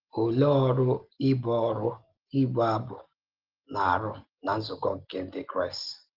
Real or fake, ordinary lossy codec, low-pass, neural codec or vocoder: real; Opus, 16 kbps; 5.4 kHz; none